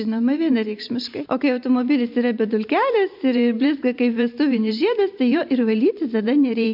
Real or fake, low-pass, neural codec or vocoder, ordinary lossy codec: fake; 5.4 kHz; vocoder, 44.1 kHz, 128 mel bands every 512 samples, BigVGAN v2; MP3, 48 kbps